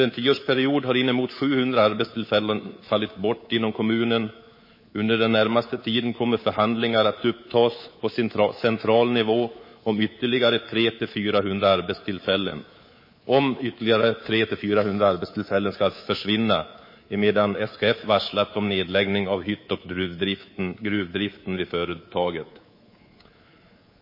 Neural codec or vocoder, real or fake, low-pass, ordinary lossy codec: codec, 24 kHz, 3.1 kbps, DualCodec; fake; 5.4 kHz; MP3, 24 kbps